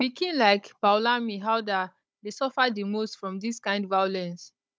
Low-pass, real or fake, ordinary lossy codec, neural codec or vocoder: none; fake; none; codec, 16 kHz, 16 kbps, FunCodec, trained on Chinese and English, 50 frames a second